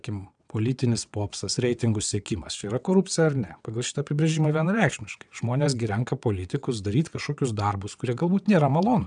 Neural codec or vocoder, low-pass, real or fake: vocoder, 22.05 kHz, 80 mel bands, WaveNeXt; 9.9 kHz; fake